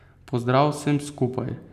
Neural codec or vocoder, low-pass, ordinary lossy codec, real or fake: none; 14.4 kHz; none; real